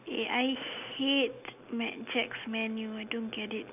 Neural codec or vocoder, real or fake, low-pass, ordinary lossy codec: none; real; 3.6 kHz; none